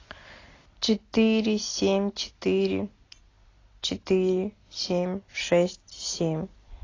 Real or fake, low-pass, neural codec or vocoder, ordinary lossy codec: real; 7.2 kHz; none; AAC, 32 kbps